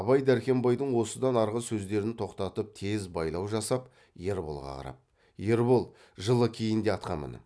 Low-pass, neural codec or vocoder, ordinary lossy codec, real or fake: none; none; none; real